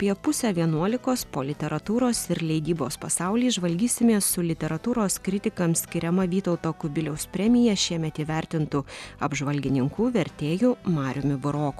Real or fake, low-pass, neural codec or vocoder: real; 14.4 kHz; none